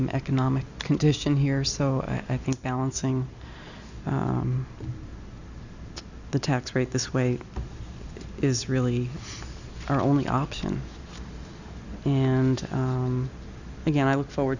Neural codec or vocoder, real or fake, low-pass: none; real; 7.2 kHz